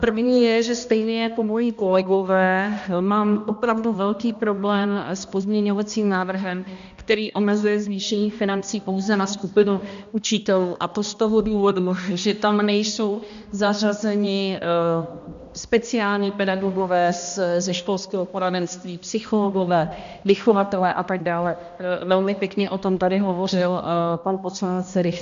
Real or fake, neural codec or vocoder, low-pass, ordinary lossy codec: fake; codec, 16 kHz, 1 kbps, X-Codec, HuBERT features, trained on balanced general audio; 7.2 kHz; MP3, 64 kbps